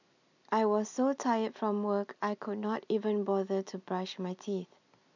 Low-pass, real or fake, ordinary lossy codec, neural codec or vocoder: 7.2 kHz; real; none; none